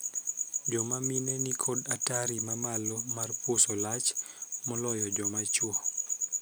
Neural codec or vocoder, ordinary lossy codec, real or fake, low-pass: none; none; real; none